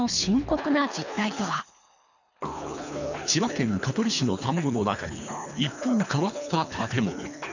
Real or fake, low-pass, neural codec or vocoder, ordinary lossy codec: fake; 7.2 kHz; codec, 24 kHz, 3 kbps, HILCodec; none